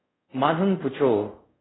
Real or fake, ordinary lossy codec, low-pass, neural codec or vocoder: fake; AAC, 16 kbps; 7.2 kHz; codec, 24 kHz, 0.5 kbps, DualCodec